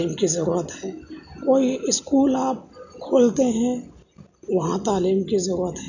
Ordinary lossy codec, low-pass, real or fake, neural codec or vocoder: none; 7.2 kHz; real; none